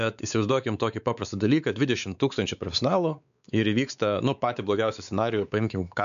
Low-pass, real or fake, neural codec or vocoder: 7.2 kHz; fake; codec, 16 kHz, 4 kbps, X-Codec, WavLM features, trained on Multilingual LibriSpeech